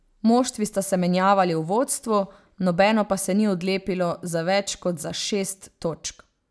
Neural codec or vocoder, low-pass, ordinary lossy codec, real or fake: none; none; none; real